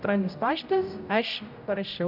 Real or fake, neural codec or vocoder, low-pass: fake; codec, 16 kHz, 0.5 kbps, X-Codec, HuBERT features, trained on general audio; 5.4 kHz